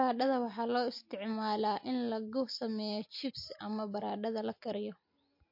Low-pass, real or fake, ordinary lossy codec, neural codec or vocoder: 5.4 kHz; real; MP3, 32 kbps; none